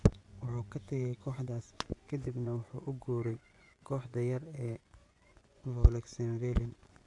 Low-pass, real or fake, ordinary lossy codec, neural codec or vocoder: 10.8 kHz; fake; AAC, 64 kbps; vocoder, 24 kHz, 100 mel bands, Vocos